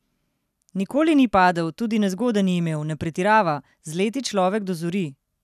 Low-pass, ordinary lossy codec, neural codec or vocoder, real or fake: 14.4 kHz; none; none; real